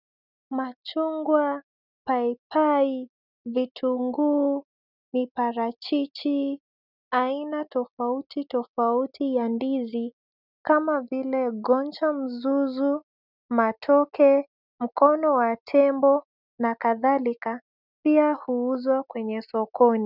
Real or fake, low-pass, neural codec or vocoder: real; 5.4 kHz; none